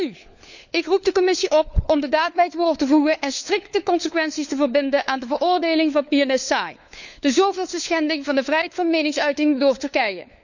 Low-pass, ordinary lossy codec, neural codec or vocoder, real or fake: 7.2 kHz; none; codec, 16 kHz, 4 kbps, FunCodec, trained on LibriTTS, 50 frames a second; fake